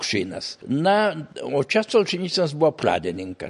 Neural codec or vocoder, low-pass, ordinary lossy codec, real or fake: none; 10.8 kHz; MP3, 48 kbps; real